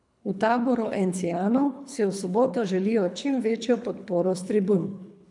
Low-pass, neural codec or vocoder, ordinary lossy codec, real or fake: 10.8 kHz; codec, 24 kHz, 3 kbps, HILCodec; none; fake